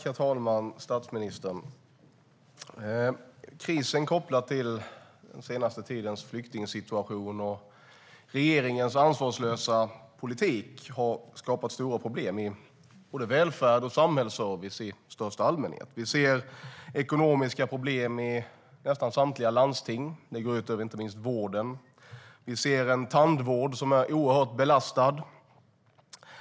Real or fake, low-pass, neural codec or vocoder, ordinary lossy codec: real; none; none; none